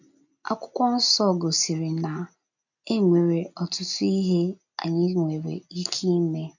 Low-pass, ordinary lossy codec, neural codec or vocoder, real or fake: 7.2 kHz; none; none; real